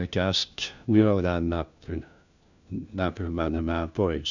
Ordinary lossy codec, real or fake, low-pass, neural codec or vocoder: none; fake; 7.2 kHz; codec, 16 kHz, 1 kbps, FunCodec, trained on LibriTTS, 50 frames a second